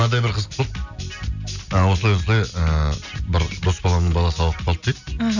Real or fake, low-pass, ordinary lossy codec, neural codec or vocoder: real; 7.2 kHz; none; none